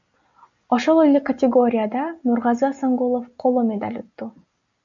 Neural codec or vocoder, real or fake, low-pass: none; real; 7.2 kHz